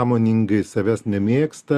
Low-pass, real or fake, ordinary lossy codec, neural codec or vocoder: 14.4 kHz; real; Opus, 64 kbps; none